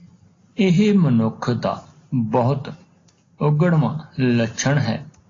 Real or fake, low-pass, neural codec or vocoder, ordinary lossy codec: real; 7.2 kHz; none; AAC, 32 kbps